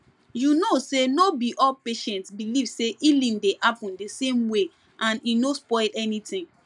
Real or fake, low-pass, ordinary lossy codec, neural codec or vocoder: real; 10.8 kHz; none; none